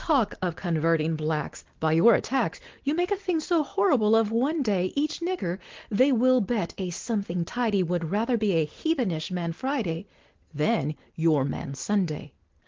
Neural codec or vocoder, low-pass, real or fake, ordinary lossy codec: none; 7.2 kHz; real; Opus, 16 kbps